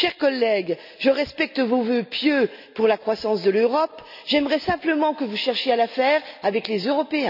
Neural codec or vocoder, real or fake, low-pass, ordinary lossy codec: none; real; 5.4 kHz; none